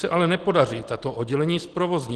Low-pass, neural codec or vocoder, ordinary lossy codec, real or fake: 10.8 kHz; none; Opus, 16 kbps; real